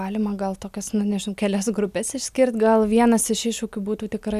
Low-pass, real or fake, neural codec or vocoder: 14.4 kHz; real; none